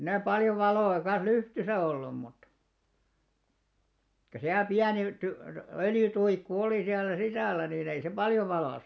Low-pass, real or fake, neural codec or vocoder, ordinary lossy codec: none; real; none; none